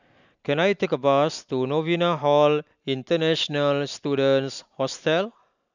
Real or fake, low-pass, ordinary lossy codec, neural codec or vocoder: real; 7.2 kHz; none; none